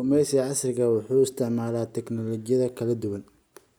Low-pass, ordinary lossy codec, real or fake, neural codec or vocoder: none; none; real; none